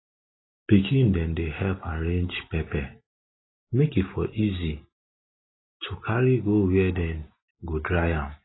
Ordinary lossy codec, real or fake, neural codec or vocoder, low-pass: AAC, 16 kbps; real; none; 7.2 kHz